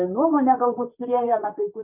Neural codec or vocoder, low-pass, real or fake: vocoder, 44.1 kHz, 128 mel bands, Pupu-Vocoder; 3.6 kHz; fake